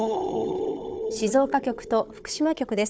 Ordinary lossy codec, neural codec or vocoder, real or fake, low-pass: none; codec, 16 kHz, 4 kbps, FunCodec, trained on Chinese and English, 50 frames a second; fake; none